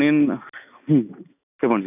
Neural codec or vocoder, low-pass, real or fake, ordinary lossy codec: none; 3.6 kHz; real; none